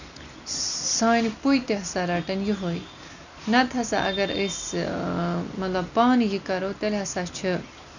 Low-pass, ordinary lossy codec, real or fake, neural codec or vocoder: 7.2 kHz; none; real; none